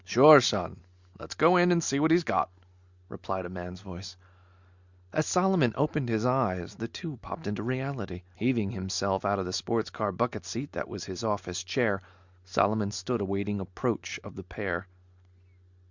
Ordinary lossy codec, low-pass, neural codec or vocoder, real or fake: Opus, 64 kbps; 7.2 kHz; none; real